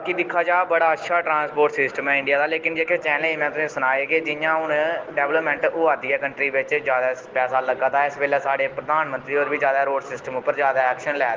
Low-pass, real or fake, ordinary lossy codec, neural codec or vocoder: 7.2 kHz; real; Opus, 16 kbps; none